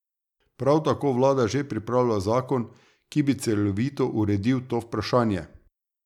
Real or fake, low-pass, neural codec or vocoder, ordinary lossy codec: real; 19.8 kHz; none; none